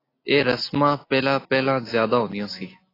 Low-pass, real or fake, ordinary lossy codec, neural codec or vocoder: 5.4 kHz; real; AAC, 24 kbps; none